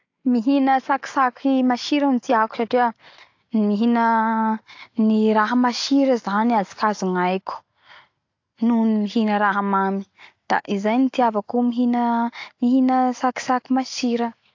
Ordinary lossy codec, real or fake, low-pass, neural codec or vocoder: AAC, 48 kbps; real; 7.2 kHz; none